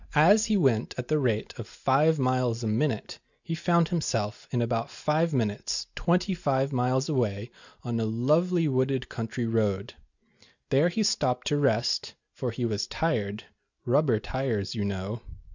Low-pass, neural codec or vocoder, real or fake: 7.2 kHz; none; real